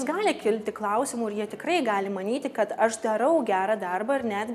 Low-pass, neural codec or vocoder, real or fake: 14.4 kHz; none; real